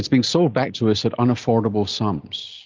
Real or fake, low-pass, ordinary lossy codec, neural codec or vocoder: real; 7.2 kHz; Opus, 32 kbps; none